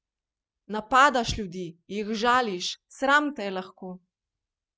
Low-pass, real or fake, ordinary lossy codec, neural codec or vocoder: none; real; none; none